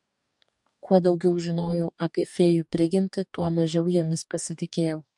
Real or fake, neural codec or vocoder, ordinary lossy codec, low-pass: fake; codec, 44.1 kHz, 2.6 kbps, DAC; MP3, 64 kbps; 10.8 kHz